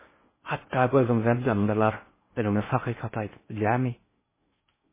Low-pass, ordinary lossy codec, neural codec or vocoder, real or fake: 3.6 kHz; MP3, 16 kbps; codec, 16 kHz in and 24 kHz out, 0.6 kbps, FocalCodec, streaming, 4096 codes; fake